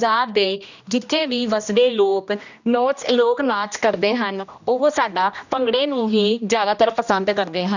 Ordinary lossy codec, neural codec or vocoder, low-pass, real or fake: none; codec, 16 kHz, 1 kbps, X-Codec, HuBERT features, trained on general audio; 7.2 kHz; fake